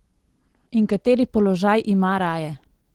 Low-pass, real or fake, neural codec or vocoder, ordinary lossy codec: 19.8 kHz; real; none; Opus, 16 kbps